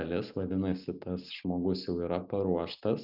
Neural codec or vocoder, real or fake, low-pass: none; real; 5.4 kHz